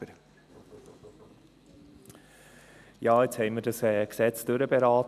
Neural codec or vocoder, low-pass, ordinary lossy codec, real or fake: none; 14.4 kHz; none; real